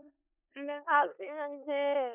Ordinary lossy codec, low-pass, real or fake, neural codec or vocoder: none; 3.6 kHz; fake; codec, 16 kHz in and 24 kHz out, 0.4 kbps, LongCat-Audio-Codec, four codebook decoder